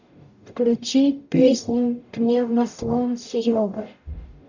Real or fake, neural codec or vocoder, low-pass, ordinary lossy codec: fake; codec, 44.1 kHz, 0.9 kbps, DAC; 7.2 kHz; none